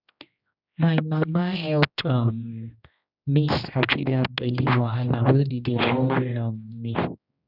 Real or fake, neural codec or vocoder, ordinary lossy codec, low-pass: fake; codec, 16 kHz, 1 kbps, X-Codec, HuBERT features, trained on general audio; none; 5.4 kHz